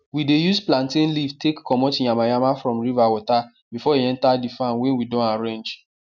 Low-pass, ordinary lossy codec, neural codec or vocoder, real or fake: 7.2 kHz; none; none; real